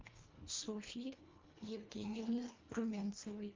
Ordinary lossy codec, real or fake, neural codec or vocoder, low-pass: Opus, 24 kbps; fake; codec, 24 kHz, 1.5 kbps, HILCodec; 7.2 kHz